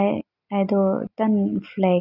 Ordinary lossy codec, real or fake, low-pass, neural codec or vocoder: none; real; 5.4 kHz; none